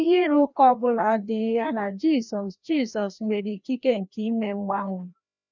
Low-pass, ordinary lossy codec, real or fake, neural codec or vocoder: 7.2 kHz; none; fake; codec, 16 kHz, 1 kbps, FreqCodec, larger model